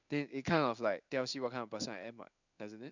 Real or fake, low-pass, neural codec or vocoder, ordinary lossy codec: fake; 7.2 kHz; codec, 16 kHz in and 24 kHz out, 1 kbps, XY-Tokenizer; none